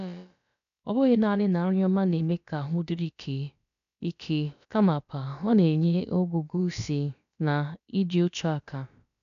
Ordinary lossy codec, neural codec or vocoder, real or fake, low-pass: none; codec, 16 kHz, about 1 kbps, DyCAST, with the encoder's durations; fake; 7.2 kHz